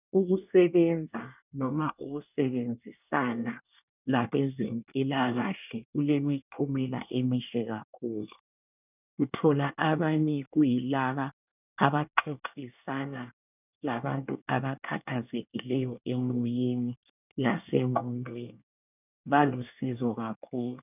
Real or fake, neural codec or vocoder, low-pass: fake; codec, 24 kHz, 1 kbps, SNAC; 3.6 kHz